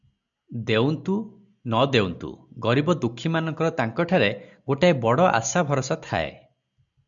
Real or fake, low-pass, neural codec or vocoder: real; 7.2 kHz; none